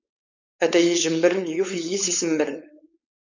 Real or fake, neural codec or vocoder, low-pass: fake; codec, 16 kHz, 4.8 kbps, FACodec; 7.2 kHz